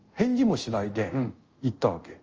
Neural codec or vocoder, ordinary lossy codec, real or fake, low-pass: codec, 24 kHz, 0.5 kbps, DualCodec; Opus, 24 kbps; fake; 7.2 kHz